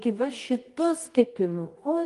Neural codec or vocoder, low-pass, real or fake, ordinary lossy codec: codec, 24 kHz, 0.9 kbps, WavTokenizer, medium music audio release; 10.8 kHz; fake; Opus, 24 kbps